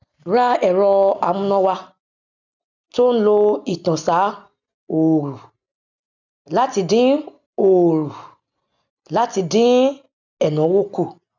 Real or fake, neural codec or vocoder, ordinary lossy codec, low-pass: real; none; none; 7.2 kHz